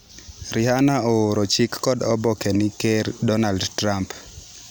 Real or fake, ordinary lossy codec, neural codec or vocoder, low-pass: real; none; none; none